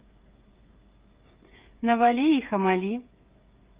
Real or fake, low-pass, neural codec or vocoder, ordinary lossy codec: fake; 3.6 kHz; codec, 16 kHz, 8 kbps, FreqCodec, smaller model; Opus, 32 kbps